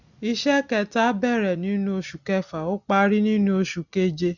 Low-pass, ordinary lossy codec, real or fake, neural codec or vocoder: 7.2 kHz; none; real; none